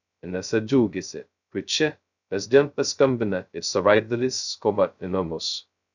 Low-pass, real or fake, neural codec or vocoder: 7.2 kHz; fake; codec, 16 kHz, 0.2 kbps, FocalCodec